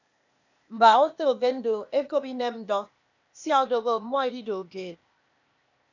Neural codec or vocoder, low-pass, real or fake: codec, 16 kHz, 0.8 kbps, ZipCodec; 7.2 kHz; fake